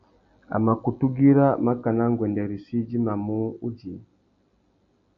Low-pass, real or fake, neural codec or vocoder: 7.2 kHz; real; none